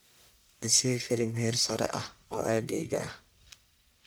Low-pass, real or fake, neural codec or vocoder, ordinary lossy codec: none; fake; codec, 44.1 kHz, 1.7 kbps, Pupu-Codec; none